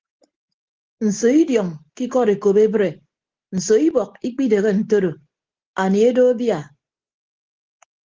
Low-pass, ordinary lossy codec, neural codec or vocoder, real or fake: 7.2 kHz; Opus, 16 kbps; none; real